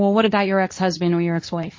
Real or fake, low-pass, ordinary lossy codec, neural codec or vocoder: fake; 7.2 kHz; MP3, 32 kbps; codec, 24 kHz, 0.9 kbps, WavTokenizer, medium speech release version 2